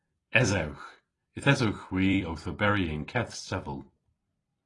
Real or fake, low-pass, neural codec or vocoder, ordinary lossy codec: fake; 10.8 kHz; vocoder, 44.1 kHz, 128 mel bands every 256 samples, BigVGAN v2; AAC, 32 kbps